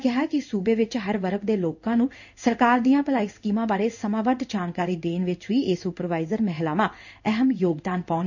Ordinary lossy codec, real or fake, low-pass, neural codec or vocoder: none; fake; 7.2 kHz; codec, 16 kHz in and 24 kHz out, 1 kbps, XY-Tokenizer